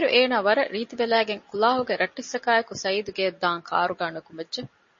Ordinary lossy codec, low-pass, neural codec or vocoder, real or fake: MP3, 32 kbps; 7.2 kHz; none; real